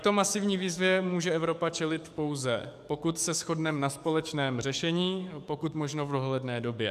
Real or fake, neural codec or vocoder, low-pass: fake; codec, 44.1 kHz, 7.8 kbps, DAC; 14.4 kHz